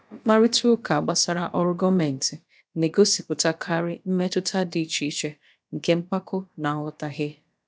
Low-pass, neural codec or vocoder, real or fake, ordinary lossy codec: none; codec, 16 kHz, about 1 kbps, DyCAST, with the encoder's durations; fake; none